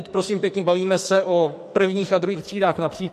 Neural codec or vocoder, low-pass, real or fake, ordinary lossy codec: codec, 44.1 kHz, 2.6 kbps, SNAC; 14.4 kHz; fake; MP3, 64 kbps